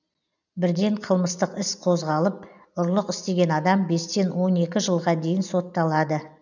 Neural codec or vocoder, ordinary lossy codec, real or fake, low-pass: none; none; real; 7.2 kHz